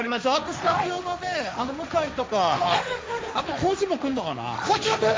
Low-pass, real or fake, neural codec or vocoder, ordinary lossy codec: none; fake; codec, 16 kHz, 1.1 kbps, Voila-Tokenizer; none